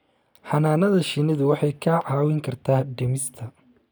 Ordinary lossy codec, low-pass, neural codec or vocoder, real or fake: none; none; none; real